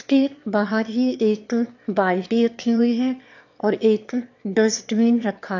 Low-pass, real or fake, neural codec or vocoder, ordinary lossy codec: 7.2 kHz; fake; autoencoder, 22.05 kHz, a latent of 192 numbers a frame, VITS, trained on one speaker; AAC, 48 kbps